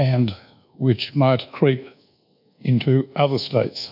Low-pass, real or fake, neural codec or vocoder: 5.4 kHz; fake; codec, 24 kHz, 1.2 kbps, DualCodec